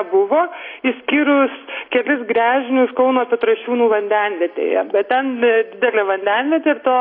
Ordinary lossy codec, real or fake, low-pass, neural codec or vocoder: AAC, 32 kbps; real; 5.4 kHz; none